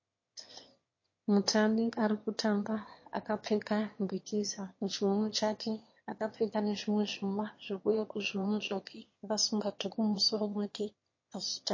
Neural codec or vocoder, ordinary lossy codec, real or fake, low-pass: autoencoder, 22.05 kHz, a latent of 192 numbers a frame, VITS, trained on one speaker; MP3, 32 kbps; fake; 7.2 kHz